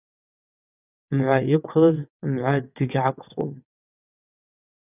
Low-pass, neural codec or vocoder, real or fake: 3.6 kHz; vocoder, 22.05 kHz, 80 mel bands, WaveNeXt; fake